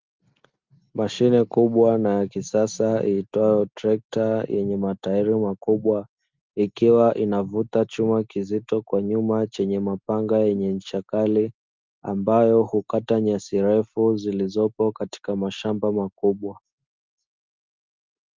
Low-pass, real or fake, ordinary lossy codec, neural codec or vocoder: 7.2 kHz; real; Opus, 32 kbps; none